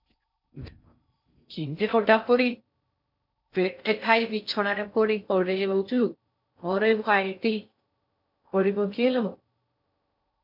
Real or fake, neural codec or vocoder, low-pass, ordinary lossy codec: fake; codec, 16 kHz in and 24 kHz out, 0.6 kbps, FocalCodec, streaming, 4096 codes; 5.4 kHz; MP3, 48 kbps